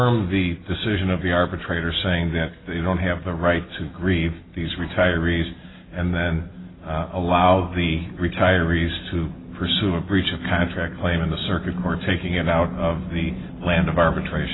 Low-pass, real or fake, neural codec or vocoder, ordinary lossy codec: 7.2 kHz; real; none; AAC, 16 kbps